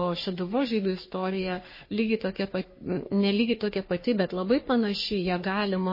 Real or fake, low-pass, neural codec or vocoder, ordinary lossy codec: fake; 5.4 kHz; codec, 44.1 kHz, 3.4 kbps, Pupu-Codec; MP3, 24 kbps